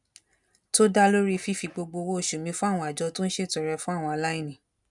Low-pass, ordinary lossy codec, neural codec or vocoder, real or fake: 10.8 kHz; none; none; real